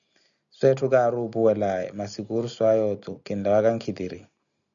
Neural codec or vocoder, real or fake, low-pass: none; real; 7.2 kHz